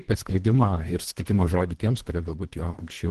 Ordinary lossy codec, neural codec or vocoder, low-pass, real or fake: Opus, 16 kbps; codec, 24 kHz, 1.5 kbps, HILCodec; 10.8 kHz; fake